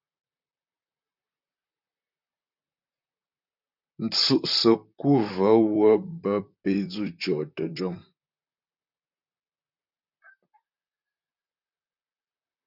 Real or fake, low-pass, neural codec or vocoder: fake; 5.4 kHz; vocoder, 44.1 kHz, 128 mel bands, Pupu-Vocoder